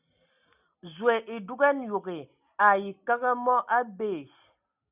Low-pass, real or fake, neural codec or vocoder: 3.6 kHz; real; none